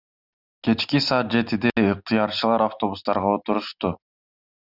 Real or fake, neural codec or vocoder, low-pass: real; none; 5.4 kHz